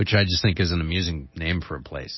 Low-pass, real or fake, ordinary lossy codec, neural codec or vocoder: 7.2 kHz; real; MP3, 24 kbps; none